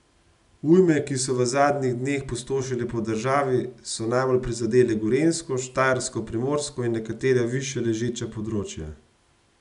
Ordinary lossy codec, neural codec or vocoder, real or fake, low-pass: none; none; real; 10.8 kHz